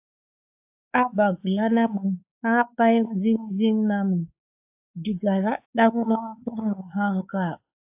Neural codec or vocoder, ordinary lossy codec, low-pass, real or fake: codec, 16 kHz, 4 kbps, X-Codec, WavLM features, trained on Multilingual LibriSpeech; AAC, 32 kbps; 3.6 kHz; fake